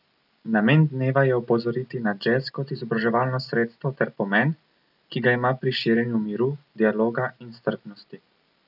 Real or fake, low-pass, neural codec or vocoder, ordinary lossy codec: real; 5.4 kHz; none; none